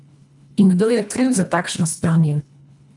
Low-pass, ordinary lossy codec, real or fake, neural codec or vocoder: 10.8 kHz; none; fake; codec, 24 kHz, 1.5 kbps, HILCodec